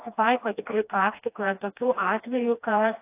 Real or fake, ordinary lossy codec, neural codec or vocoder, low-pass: fake; AAC, 24 kbps; codec, 16 kHz, 1 kbps, FreqCodec, smaller model; 3.6 kHz